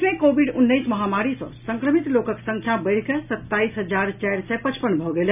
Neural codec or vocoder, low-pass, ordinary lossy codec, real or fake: none; 3.6 kHz; none; real